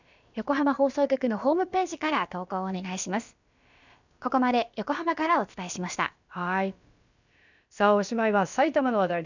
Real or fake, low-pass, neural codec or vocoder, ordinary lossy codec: fake; 7.2 kHz; codec, 16 kHz, about 1 kbps, DyCAST, with the encoder's durations; none